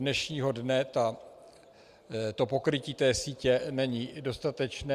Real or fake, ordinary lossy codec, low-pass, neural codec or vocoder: real; Opus, 64 kbps; 14.4 kHz; none